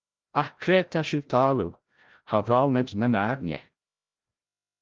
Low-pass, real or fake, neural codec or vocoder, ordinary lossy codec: 7.2 kHz; fake; codec, 16 kHz, 0.5 kbps, FreqCodec, larger model; Opus, 32 kbps